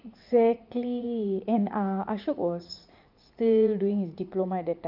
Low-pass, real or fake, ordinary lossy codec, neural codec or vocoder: 5.4 kHz; fake; Opus, 24 kbps; vocoder, 44.1 kHz, 80 mel bands, Vocos